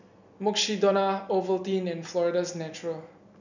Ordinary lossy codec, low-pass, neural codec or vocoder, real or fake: none; 7.2 kHz; none; real